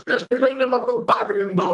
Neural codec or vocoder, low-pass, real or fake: codec, 24 kHz, 1.5 kbps, HILCodec; 10.8 kHz; fake